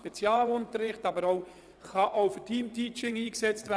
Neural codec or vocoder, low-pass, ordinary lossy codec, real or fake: vocoder, 22.05 kHz, 80 mel bands, WaveNeXt; none; none; fake